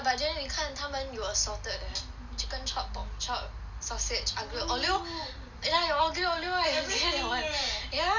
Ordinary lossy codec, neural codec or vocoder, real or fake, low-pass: none; none; real; 7.2 kHz